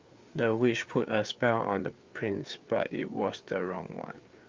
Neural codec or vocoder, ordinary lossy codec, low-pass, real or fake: codec, 16 kHz, 4 kbps, FreqCodec, larger model; Opus, 32 kbps; 7.2 kHz; fake